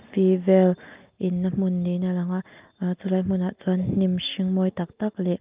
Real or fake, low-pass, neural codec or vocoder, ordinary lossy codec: real; 3.6 kHz; none; Opus, 24 kbps